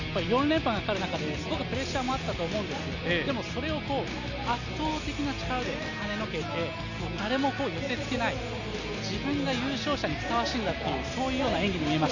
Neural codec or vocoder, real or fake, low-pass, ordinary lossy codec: none; real; 7.2 kHz; none